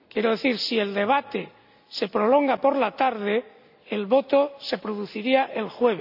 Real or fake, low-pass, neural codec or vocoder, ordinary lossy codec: real; 5.4 kHz; none; none